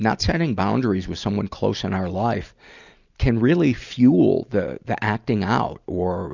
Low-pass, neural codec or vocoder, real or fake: 7.2 kHz; none; real